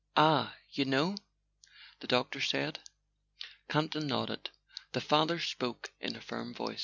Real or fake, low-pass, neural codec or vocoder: real; 7.2 kHz; none